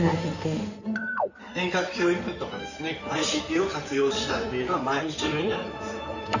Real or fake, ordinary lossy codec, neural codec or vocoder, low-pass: fake; none; codec, 16 kHz in and 24 kHz out, 2.2 kbps, FireRedTTS-2 codec; 7.2 kHz